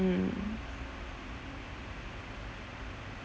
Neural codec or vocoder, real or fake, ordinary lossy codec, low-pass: none; real; none; none